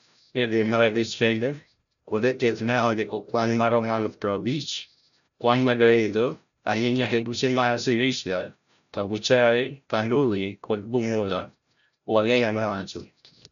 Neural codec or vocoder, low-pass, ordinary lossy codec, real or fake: codec, 16 kHz, 0.5 kbps, FreqCodec, larger model; 7.2 kHz; none; fake